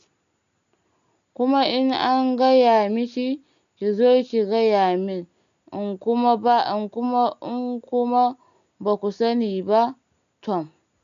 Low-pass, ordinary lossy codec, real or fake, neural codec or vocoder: 7.2 kHz; none; real; none